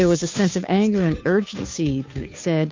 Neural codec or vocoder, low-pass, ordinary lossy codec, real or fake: codec, 16 kHz, 2 kbps, FunCodec, trained on Chinese and English, 25 frames a second; 7.2 kHz; MP3, 64 kbps; fake